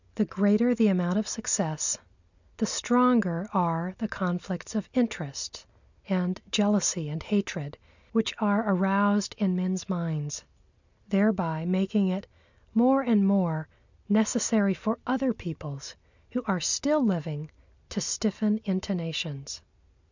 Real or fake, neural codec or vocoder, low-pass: real; none; 7.2 kHz